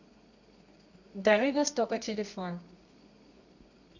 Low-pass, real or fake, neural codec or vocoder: 7.2 kHz; fake; codec, 24 kHz, 0.9 kbps, WavTokenizer, medium music audio release